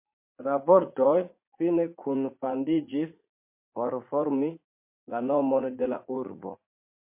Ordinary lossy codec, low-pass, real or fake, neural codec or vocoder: MP3, 24 kbps; 3.6 kHz; fake; vocoder, 44.1 kHz, 80 mel bands, Vocos